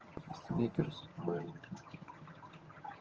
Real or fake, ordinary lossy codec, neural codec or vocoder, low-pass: fake; Opus, 24 kbps; vocoder, 22.05 kHz, 80 mel bands, HiFi-GAN; 7.2 kHz